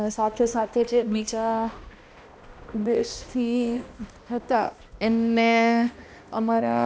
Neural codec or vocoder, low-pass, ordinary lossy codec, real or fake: codec, 16 kHz, 1 kbps, X-Codec, HuBERT features, trained on balanced general audio; none; none; fake